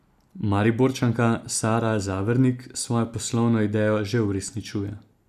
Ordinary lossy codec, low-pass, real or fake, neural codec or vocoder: none; 14.4 kHz; real; none